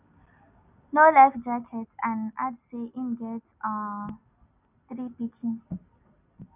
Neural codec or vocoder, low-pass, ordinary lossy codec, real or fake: none; 3.6 kHz; none; real